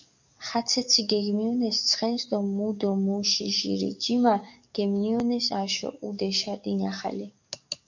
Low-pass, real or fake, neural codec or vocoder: 7.2 kHz; fake; codec, 44.1 kHz, 7.8 kbps, DAC